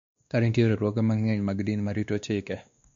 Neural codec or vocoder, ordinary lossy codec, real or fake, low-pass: codec, 16 kHz, 2 kbps, X-Codec, WavLM features, trained on Multilingual LibriSpeech; MP3, 48 kbps; fake; 7.2 kHz